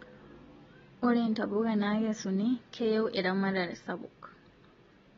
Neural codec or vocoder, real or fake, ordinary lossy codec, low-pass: none; real; AAC, 24 kbps; 7.2 kHz